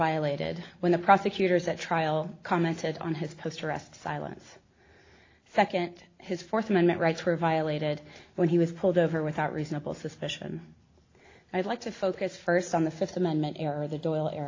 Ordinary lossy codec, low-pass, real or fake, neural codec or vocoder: AAC, 32 kbps; 7.2 kHz; real; none